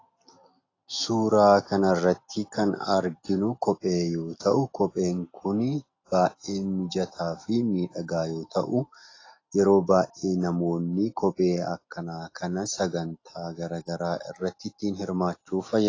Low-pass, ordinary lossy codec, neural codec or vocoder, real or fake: 7.2 kHz; AAC, 32 kbps; none; real